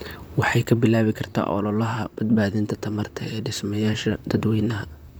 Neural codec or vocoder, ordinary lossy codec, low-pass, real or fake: vocoder, 44.1 kHz, 128 mel bands, Pupu-Vocoder; none; none; fake